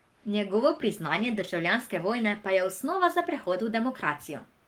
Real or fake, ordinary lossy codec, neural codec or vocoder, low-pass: fake; Opus, 24 kbps; codec, 44.1 kHz, 7.8 kbps, Pupu-Codec; 19.8 kHz